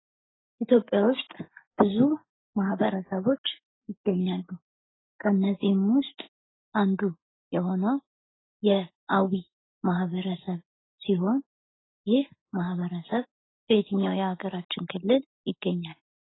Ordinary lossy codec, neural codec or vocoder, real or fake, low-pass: AAC, 16 kbps; none; real; 7.2 kHz